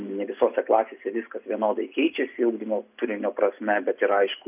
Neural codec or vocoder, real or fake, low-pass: none; real; 3.6 kHz